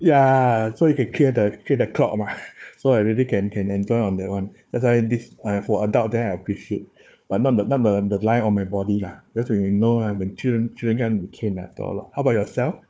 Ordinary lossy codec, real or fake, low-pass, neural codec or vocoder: none; fake; none; codec, 16 kHz, 4 kbps, FunCodec, trained on Chinese and English, 50 frames a second